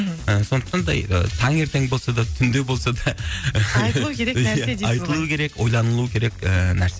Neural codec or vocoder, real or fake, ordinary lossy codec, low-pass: none; real; none; none